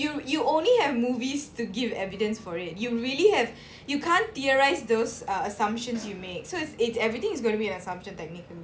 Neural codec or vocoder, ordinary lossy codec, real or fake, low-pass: none; none; real; none